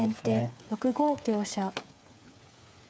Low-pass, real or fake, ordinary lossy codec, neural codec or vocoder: none; fake; none; codec, 16 kHz, 8 kbps, FreqCodec, smaller model